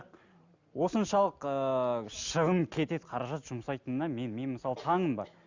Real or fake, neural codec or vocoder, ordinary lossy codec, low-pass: real; none; Opus, 64 kbps; 7.2 kHz